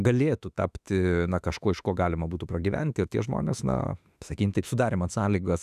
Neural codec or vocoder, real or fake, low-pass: autoencoder, 48 kHz, 32 numbers a frame, DAC-VAE, trained on Japanese speech; fake; 14.4 kHz